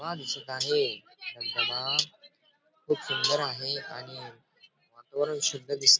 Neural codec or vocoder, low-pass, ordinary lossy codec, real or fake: none; none; none; real